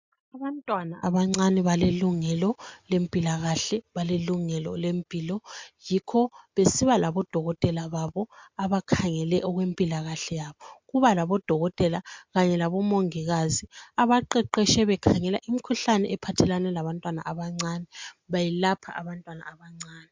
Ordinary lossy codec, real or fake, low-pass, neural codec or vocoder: MP3, 64 kbps; real; 7.2 kHz; none